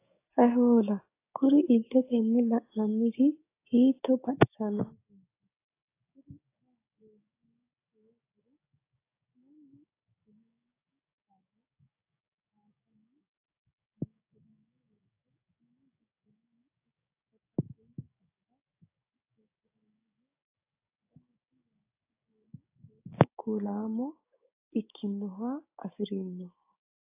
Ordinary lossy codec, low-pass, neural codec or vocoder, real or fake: AAC, 24 kbps; 3.6 kHz; codec, 16 kHz, 6 kbps, DAC; fake